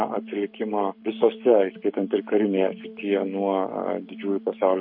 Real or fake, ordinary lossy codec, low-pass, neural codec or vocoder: fake; MP3, 32 kbps; 5.4 kHz; codec, 44.1 kHz, 7.8 kbps, Pupu-Codec